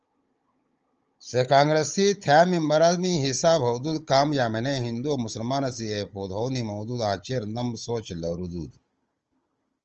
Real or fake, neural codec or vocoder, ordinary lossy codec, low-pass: fake; codec, 16 kHz, 16 kbps, FunCodec, trained on Chinese and English, 50 frames a second; Opus, 24 kbps; 7.2 kHz